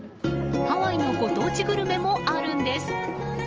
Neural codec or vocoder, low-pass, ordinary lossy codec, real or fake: none; 7.2 kHz; Opus, 24 kbps; real